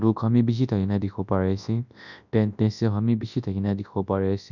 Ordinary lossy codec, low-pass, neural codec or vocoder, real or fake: none; 7.2 kHz; codec, 24 kHz, 0.9 kbps, WavTokenizer, large speech release; fake